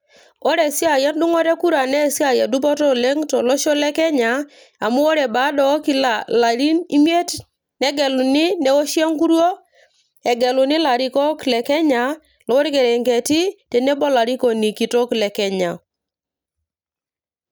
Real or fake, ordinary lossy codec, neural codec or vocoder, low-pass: real; none; none; none